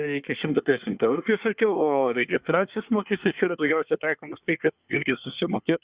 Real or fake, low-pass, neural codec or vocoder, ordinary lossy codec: fake; 3.6 kHz; codec, 24 kHz, 1 kbps, SNAC; Opus, 64 kbps